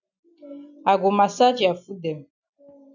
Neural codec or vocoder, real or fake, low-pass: none; real; 7.2 kHz